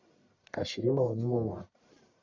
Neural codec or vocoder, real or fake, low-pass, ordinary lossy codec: codec, 44.1 kHz, 1.7 kbps, Pupu-Codec; fake; 7.2 kHz; MP3, 64 kbps